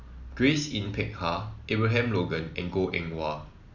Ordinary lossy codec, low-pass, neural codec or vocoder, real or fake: none; 7.2 kHz; none; real